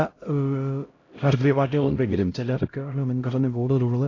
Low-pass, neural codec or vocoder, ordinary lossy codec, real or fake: 7.2 kHz; codec, 16 kHz, 0.5 kbps, X-Codec, HuBERT features, trained on LibriSpeech; AAC, 32 kbps; fake